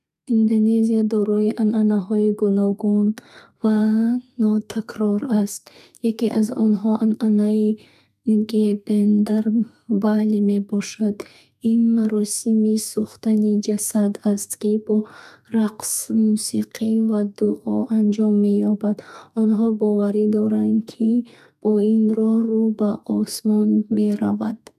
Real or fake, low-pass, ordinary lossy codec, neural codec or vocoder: fake; 14.4 kHz; none; codec, 32 kHz, 1.9 kbps, SNAC